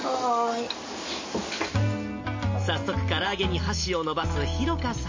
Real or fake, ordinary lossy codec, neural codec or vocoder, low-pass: real; MP3, 32 kbps; none; 7.2 kHz